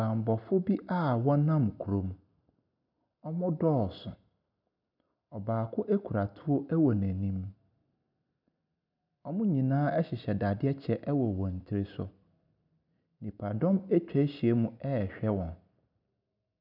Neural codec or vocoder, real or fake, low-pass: none; real; 5.4 kHz